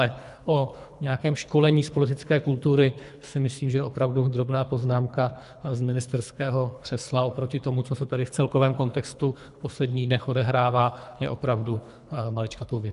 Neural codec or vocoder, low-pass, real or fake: codec, 24 kHz, 3 kbps, HILCodec; 10.8 kHz; fake